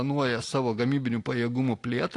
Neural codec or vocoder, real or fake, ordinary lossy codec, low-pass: none; real; AAC, 48 kbps; 10.8 kHz